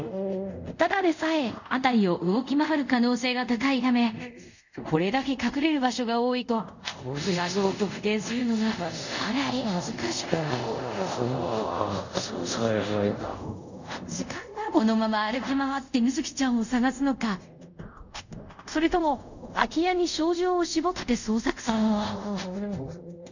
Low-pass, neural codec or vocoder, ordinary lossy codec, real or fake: 7.2 kHz; codec, 24 kHz, 0.5 kbps, DualCodec; none; fake